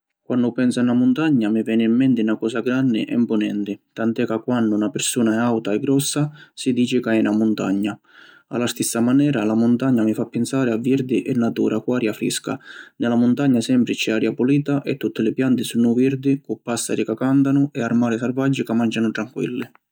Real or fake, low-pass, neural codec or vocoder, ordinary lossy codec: real; none; none; none